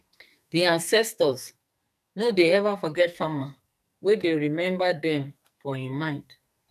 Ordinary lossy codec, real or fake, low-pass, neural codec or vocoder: none; fake; 14.4 kHz; codec, 44.1 kHz, 2.6 kbps, SNAC